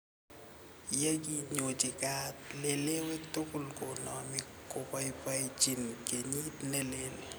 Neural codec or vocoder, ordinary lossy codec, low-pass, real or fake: none; none; none; real